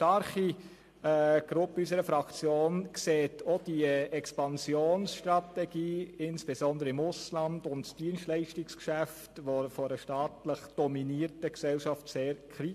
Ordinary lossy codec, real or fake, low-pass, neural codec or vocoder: MP3, 64 kbps; real; 14.4 kHz; none